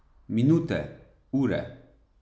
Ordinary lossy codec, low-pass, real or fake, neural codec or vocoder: none; none; real; none